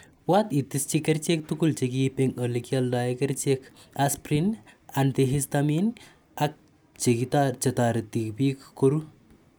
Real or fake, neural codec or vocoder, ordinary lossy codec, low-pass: real; none; none; none